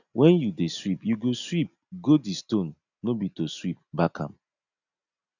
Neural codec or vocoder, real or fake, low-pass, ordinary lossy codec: none; real; 7.2 kHz; none